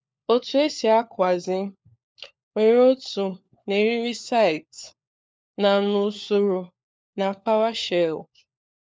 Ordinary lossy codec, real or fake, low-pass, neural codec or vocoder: none; fake; none; codec, 16 kHz, 4 kbps, FunCodec, trained on LibriTTS, 50 frames a second